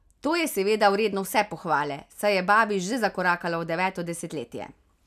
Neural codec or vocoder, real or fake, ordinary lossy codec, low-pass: none; real; none; 14.4 kHz